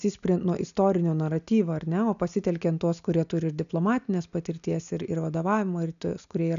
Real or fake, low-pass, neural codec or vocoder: real; 7.2 kHz; none